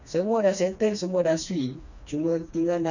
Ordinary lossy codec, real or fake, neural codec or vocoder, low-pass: none; fake; codec, 16 kHz, 2 kbps, FreqCodec, smaller model; 7.2 kHz